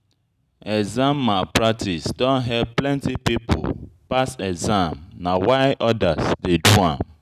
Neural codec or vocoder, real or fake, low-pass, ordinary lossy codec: none; real; 14.4 kHz; none